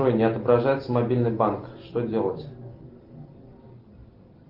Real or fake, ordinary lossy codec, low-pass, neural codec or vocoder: real; Opus, 32 kbps; 5.4 kHz; none